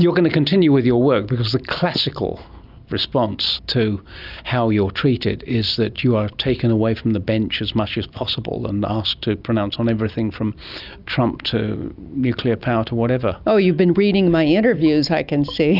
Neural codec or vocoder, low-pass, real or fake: none; 5.4 kHz; real